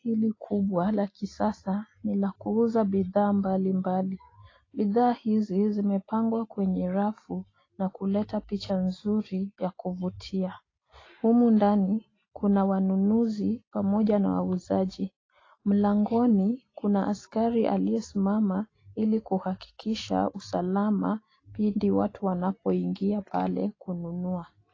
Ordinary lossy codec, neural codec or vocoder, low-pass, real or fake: AAC, 32 kbps; none; 7.2 kHz; real